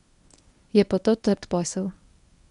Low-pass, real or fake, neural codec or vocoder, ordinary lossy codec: 10.8 kHz; fake; codec, 24 kHz, 0.9 kbps, WavTokenizer, medium speech release version 1; none